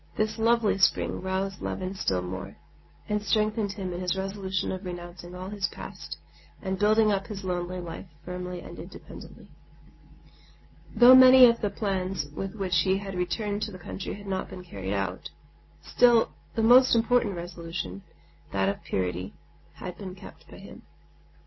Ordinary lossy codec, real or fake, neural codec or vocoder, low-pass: MP3, 24 kbps; real; none; 7.2 kHz